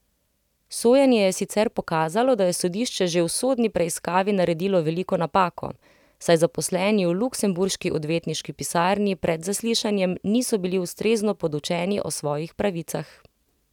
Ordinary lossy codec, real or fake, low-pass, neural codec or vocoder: none; real; 19.8 kHz; none